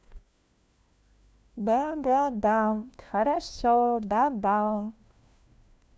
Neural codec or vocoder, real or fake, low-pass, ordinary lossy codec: codec, 16 kHz, 1 kbps, FunCodec, trained on LibriTTS, 50 frames a second; fake; none; none